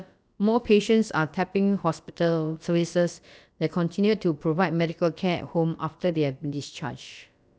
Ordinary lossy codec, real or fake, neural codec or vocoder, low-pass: none; fake; codec, 16 kHz, about 1 kbps, DyCAST, with the encoder's durations; none